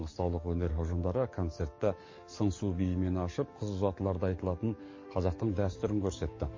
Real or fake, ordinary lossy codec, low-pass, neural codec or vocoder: fake; MP3, 32 kbps; 7.2 kHz; codec, 44.1 kHz, 7.8 kbps, DAC